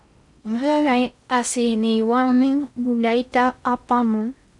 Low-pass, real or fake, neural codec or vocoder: 10.8 kHz; fake; codec, 16 kHz in and 24 kHz out, 0.6 kbps, FocalCodec, streaming, 4096 codes